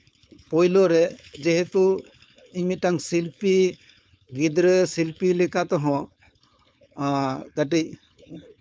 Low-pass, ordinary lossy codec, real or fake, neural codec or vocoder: none; none; fake; codec, 16 kHz, 4.8 kbps, FACodec